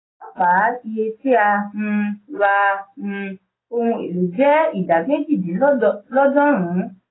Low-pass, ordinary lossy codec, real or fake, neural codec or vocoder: 7.2 kHz; AAC, 16 kbps; real; none